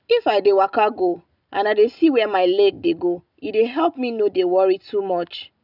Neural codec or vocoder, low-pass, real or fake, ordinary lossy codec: none; 5.4 kHz; real; none